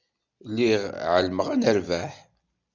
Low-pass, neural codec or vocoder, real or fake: 7.2 kHz; none; real